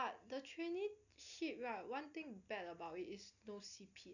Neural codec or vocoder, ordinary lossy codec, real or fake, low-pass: none; none; real; 7.2 kHz